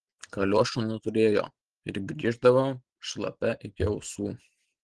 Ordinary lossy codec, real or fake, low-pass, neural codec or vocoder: Opus, 16 kbps; real; 10.8 kHz; none